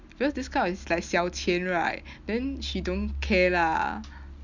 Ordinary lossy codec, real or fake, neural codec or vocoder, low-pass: none; real; none; 7.2 kHz